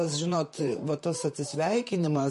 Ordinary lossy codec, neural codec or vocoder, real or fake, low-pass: MP3, 48 kbps; vocoder, 44.1 kHz, 128 mel bands, Pupu-Vocoder; fake; 14.4 kHz